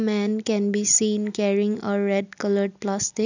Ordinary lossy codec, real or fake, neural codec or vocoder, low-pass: none; real; none; 7.2 kHz